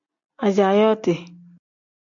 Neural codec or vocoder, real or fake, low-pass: none; real; 7.2 kHz